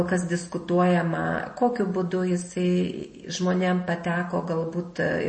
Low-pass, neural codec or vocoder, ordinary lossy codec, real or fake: 9.9 kHz; none; MP3, 32 kbps; real